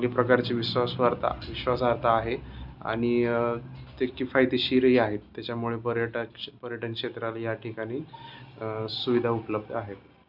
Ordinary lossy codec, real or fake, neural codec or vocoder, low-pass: none; real; none; 5.4 kHz